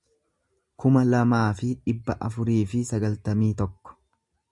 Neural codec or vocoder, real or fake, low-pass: none; real; 10.8 kHz